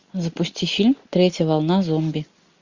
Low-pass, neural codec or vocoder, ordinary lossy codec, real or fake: 7.2 kHz; none; Opus, 64 kbps; real